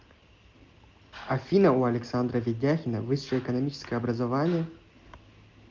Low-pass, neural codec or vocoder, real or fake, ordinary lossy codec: 7.2 kHz; none; real; Opus, 24 kbps